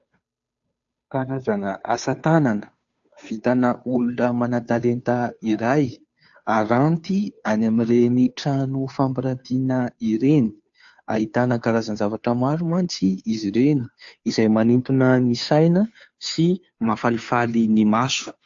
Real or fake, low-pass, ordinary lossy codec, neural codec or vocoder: fake; 7.2 kHz; AAC, 64 kbps; codec, 16 kHz, 2 kbps, FunCodec, trained on Chinese and English, 25 frames a second